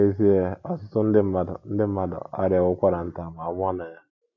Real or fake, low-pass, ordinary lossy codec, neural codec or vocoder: real; 7.2 kHz; AAC, 48 kbps; none